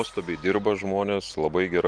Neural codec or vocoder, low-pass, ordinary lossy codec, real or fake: none; 9.9 kHz; Opus, 24 kbps; real